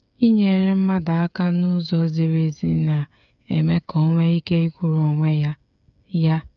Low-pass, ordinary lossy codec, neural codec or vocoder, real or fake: 7.2 kHz; none; codec, 16 kHz, 8 kbps, FreqCodec, smaller model; fake